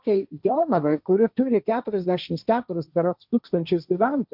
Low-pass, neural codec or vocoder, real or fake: 5.4 kHz; codec, 16 kHz, 1.1 kbps, Voila-Tokenizer; fake